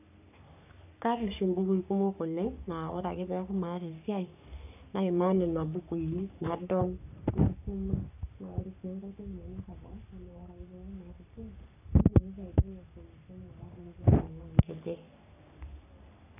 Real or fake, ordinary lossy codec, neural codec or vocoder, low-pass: fake; none; codec, 44.1 kHz, 3.4 kbps, Pupu-Codec; 3.6 kHz